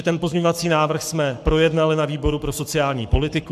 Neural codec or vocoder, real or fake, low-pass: codec, 44.1 kHz, 7.8 kbps, Pupu-Codec; fake; 14.4 kHz